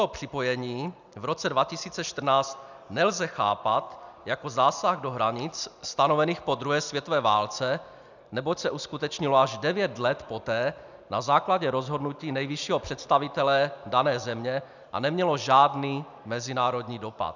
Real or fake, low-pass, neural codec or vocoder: real; 7.2 kHz; none